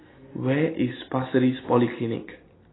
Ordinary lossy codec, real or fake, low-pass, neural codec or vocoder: AAC, 16 kbps; real; 7.2 kHz; none